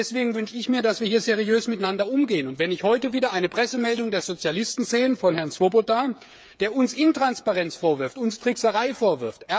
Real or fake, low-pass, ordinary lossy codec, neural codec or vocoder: fake; none; none; codec, 16 kHz, 8 kbps, FreqCodec, smaller model